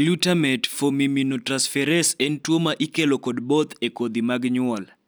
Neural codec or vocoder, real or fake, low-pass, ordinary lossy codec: none; real; none; none